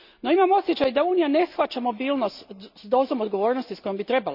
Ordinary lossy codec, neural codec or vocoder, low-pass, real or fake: none; none; 5.4 kHz; real